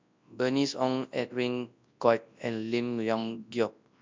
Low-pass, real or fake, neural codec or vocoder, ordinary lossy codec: 7.2 kHz; fake; codec, 24 kHz, 0.9 kbps, WavTokenizer, large speech release; MP3, 64 kbps